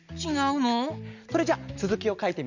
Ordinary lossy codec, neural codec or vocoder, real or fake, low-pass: none; none; real; 7.2 kHz